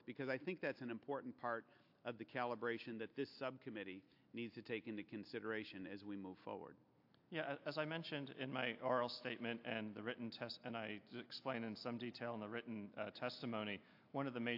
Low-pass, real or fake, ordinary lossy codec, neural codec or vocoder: 5.4 kHz; real; AAC, 48 kbps; none